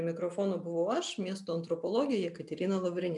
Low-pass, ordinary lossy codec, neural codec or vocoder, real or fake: 10.8 kHz; MP3, 96 kbps; none; real